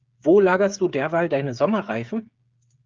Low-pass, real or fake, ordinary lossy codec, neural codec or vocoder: 7.2 kHz; fake; Opus, 24 kbps; codec, 16 kHz, 8 kbps, FreqCodec, smaller model